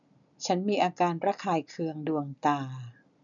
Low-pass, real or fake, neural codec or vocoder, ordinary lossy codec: 7.2 kHz; real; none; none